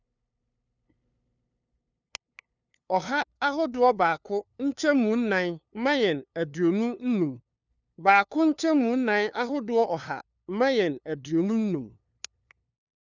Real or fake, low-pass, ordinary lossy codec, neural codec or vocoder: fake; 7.2 kHz; none; codec, 16 kHz, 2 kbps, FunCodec, trained on LibriTTS, 25 frames a second